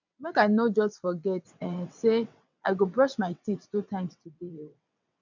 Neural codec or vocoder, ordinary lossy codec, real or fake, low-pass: vocoder, 22.05 kHz, 80 mel bands, WaveNeXt; none; fake; 7.2 kHz